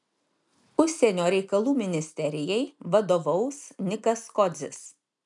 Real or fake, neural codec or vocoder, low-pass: real; none; 10.8 kHz